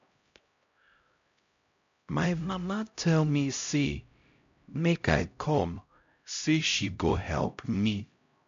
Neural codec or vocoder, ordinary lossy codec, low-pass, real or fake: codec, 16 kHz, 0.5 kbps, X-Codec, HuBERT features, trained on LibriSpeech; MP3, 48 kbps; 7.2 kHz; fake